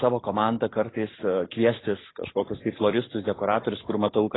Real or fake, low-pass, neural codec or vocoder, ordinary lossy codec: real; 7.2 kHz; none; AAC, 16 kbps